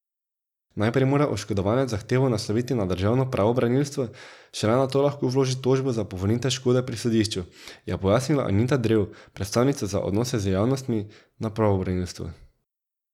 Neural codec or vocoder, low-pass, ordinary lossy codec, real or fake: none; 19.8 kHz; none; real